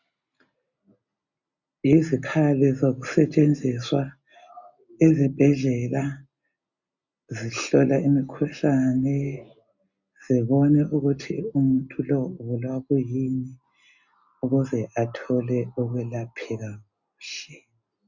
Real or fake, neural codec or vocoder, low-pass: real; none; 7.2 kHz